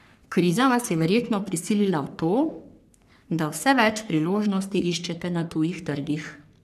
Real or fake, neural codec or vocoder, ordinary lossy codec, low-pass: fake; codec, 44.1 kHz, 3.4 kbps, Pupu-Codec; none; 14.4 kHz